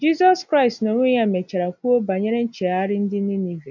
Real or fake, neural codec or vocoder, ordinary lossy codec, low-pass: real; none; none; 7.2 kHz